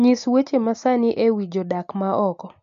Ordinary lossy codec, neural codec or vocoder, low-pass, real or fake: MP3, 48 kbps; none; 7.2 kHz; real